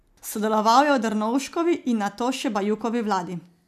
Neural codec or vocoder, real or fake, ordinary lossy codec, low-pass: none; real; none; 14.4 kHz